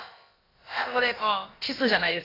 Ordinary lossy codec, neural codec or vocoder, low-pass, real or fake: AAC, 24 kbps; codec, 16 kHz, about 1 kbps, DyCAST, with the encoder's durations; 5.4 kHz; fake